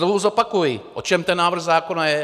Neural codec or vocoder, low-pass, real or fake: none; 14.4 kHz; real